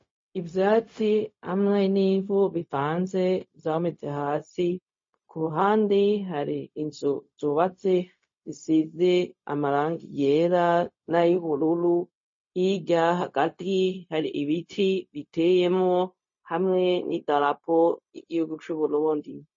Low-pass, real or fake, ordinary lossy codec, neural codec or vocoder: 7.2 kHz; fake; MP3, 32 kbps; codec, 16 kHz, 0.4 kbps, LongCat-Audio-Codec